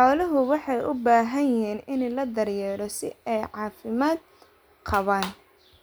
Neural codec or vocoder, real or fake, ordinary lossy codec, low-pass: none; real; none; none